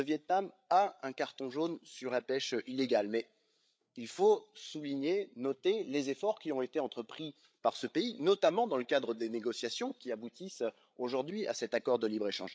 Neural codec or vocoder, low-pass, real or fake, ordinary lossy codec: codec, 16 kHz, 8 kbps, FreqCodec, larger model; none; fake; none